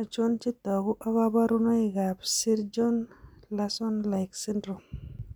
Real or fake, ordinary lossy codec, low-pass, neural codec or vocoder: real; none; none; none